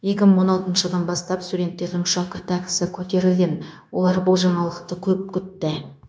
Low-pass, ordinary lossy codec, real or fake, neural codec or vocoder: none; none; fake; codec, 16 kHz, 0.9 kbps, LongCat-Audio-Codec